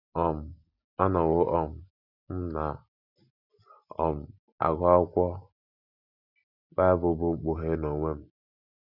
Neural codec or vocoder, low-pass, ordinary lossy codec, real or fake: none; 5.4 kHz; none; real